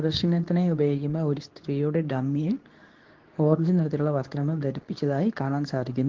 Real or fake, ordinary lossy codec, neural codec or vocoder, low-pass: fake; Opus, 16 kbps; codec, 24 kHz, 0.9 kbps, WavTokenizer, medium speech release version 2; 7.2 kHz